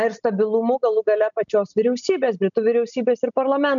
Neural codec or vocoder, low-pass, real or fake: none; 7.2 kHz; real